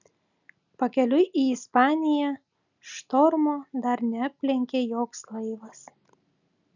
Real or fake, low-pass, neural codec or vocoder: real; 7.2 kHz; none